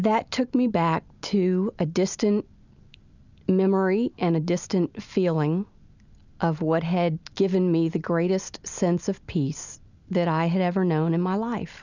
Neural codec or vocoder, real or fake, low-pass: none; real; 7.2 kHz